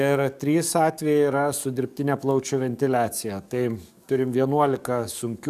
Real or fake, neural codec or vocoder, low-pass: fake; codec, 44.1 kHz, 7.8 kbps, DAC; 14.4 kHz